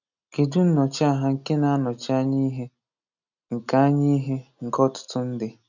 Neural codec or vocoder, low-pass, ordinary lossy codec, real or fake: none; 7.2 kHz; none; real